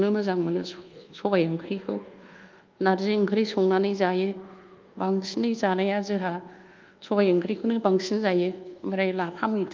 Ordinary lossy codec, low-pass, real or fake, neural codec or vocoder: Opus, 32 kbps; 7.2 kHz; fake; codec, 24 kHz, 1.2 kbps, DualCodec